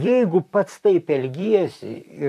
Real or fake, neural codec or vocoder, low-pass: fake; vocoder, 44.1 kHz, 128 mel bands, Pupu-Vocoder; 14.4 kHz